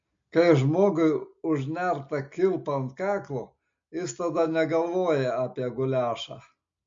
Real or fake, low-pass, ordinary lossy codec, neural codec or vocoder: real; 7.2 kHz; MP3, 48 kbps; none